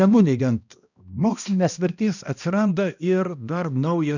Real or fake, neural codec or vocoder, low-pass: fake; codec, 16 kHz, 1 kbps, X-Codec, HuBERT features, trained on balanced general audio; 7.2 kHz